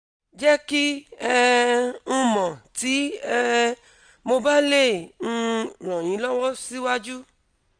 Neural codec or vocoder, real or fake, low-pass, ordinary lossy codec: none; real; 9.9 kHz; none